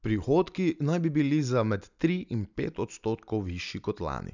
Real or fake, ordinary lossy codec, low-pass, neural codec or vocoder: real; none; 7.2 kHz; none